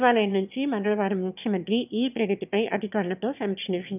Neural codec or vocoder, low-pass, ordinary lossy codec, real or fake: autoencoder, 22.05 kHz, a latent of 192 numbers a frame, VITS, trained on one speaker; 3.6 kHz; none; fake